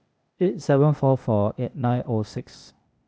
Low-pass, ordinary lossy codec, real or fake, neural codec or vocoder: none; none; fake; codec, 16 kHz, 0.8 kbps, ZipCodec